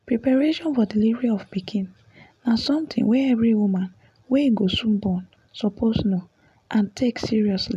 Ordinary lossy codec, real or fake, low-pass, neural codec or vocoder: none; real; 14.4 kHz; none